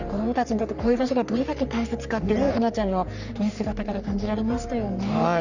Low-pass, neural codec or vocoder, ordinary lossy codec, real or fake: 7.2 kHz; codec, 44.1 kHz, 3.4 kbps, Pupu-Codec; none; fake